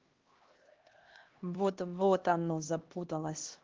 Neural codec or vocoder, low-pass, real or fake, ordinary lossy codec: codec, 16 kHz, 1 kbps, X-Codec, HuBERT features, trained on LibriSpeech; 7.2 kHz; fake; Opus, 16 kbps